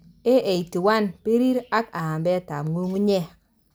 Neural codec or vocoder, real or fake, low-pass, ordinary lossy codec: none; real; none; none